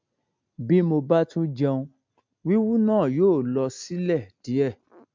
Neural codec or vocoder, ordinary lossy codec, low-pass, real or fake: none; MP3, 64 kbps; 7.2 kHz; real